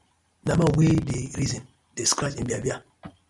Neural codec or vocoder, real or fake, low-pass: none; real; 10.8 kHz